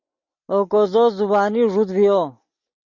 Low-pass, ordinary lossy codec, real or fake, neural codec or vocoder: 7.2 kHz; MP3, 48 kbps; real; none